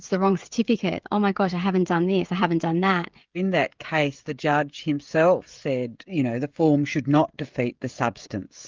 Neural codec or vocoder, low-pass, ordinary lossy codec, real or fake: codec, 16 kHz, 16 kbps, FreqCodec, smaller model; 7.2 kHz; Opus, 32 kbps; fake